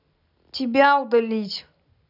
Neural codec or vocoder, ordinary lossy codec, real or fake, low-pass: none; none; real; 5.4 kHz